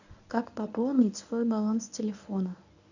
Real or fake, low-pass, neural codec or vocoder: fake; 7.2 kHz; codec, 24 kHz, 0.9 kbps, WavTokenizer, medium speech release version 1